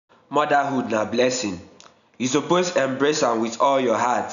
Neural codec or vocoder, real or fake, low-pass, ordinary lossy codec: none; real; 7.2 kHz; none